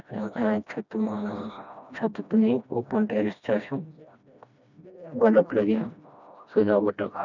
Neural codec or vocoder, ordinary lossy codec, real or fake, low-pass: codec, 16 kHz, 1 kbps, FreqCodec, smaller model; none; fake; 7.2 kHz